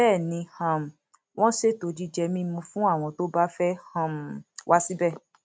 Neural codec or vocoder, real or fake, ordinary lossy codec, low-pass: none; real; none; none